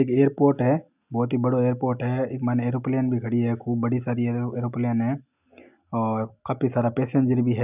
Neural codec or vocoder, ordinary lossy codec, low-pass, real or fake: none; none; 3.6 kHz; real